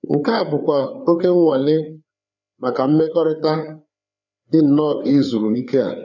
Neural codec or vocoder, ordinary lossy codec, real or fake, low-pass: codec, 16 kHz, 4 kbps, FreqCodec, larger model; none; fake; 7.2 kHz